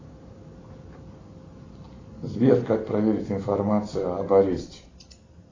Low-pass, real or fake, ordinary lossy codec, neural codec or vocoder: 7.2 kHz; fake; AAC, 32 kbps; vocoder, 44.1 kHz, 128 mel bands every 256 samples, BigVGAN v2